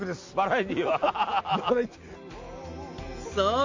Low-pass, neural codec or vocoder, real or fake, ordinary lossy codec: 7.2 kHz; none; real; AAC, 48 kbps